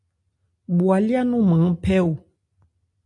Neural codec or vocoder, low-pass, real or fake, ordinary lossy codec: none; 10.8 kHz; real; AAC, 64 kbps